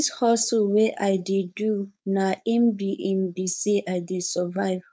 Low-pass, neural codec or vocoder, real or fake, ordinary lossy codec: none; codec, 16 kHz, 4.8 kbps, FACodec; fake; none